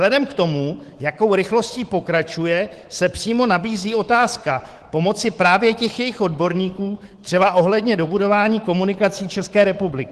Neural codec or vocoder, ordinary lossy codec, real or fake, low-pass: codec, 24 kHz, 3.1 kbps, DualCodec; Opus, 16 kbps; fake; 10.8 kHz